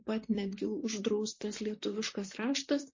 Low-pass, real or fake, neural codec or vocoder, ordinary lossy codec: 7.2 kHz; fake; vocoder, 44.1 kHz, 128 mel bands, Pupu-Vocoder; MP3, 32 kbps